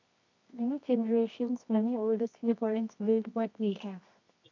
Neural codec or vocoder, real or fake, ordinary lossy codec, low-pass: codec, 24 kHz, 0.9 kbps, WavTokenizer, medium music audio release; fake; MP3, 64 kbps; 7.2 kHz